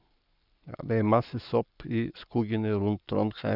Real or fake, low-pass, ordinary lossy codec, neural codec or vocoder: fake; 5.4 kHz; none; codec, 44.1 kHz, 7.8 kbps, Pupu-Codec